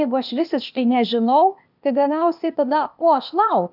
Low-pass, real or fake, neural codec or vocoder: 5.4 kHz; fake; codec, 16 kHz, 0.8 kbps, ZipCodec